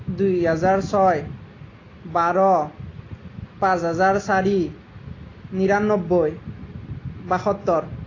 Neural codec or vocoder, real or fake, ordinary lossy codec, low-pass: none; real; AAC, 32 kbps; 7.2 kHz